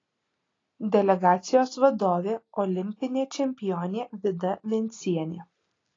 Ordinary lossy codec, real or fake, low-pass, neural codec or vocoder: AAC, 32 kbps; real; 7.2 kHz; none